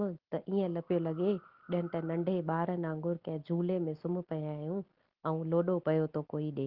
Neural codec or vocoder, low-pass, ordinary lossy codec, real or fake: none; 5.4 kHz; Opus, 16 kbps; real